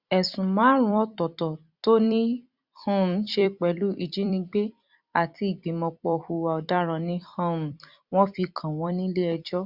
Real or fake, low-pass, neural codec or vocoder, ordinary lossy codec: fake; 5.4 kHz; vocoder, 44.1 kHz, 128 mel bands every 256 samples, BigVGAN v2; Opus, 64 kbps